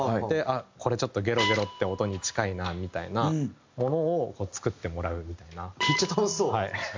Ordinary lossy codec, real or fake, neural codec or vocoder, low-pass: MP3, 64 kbps; real; none; 7.2 kHz